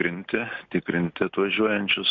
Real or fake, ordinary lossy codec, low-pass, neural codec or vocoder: real; MP3, 48 kbps; 7.2 kHz; none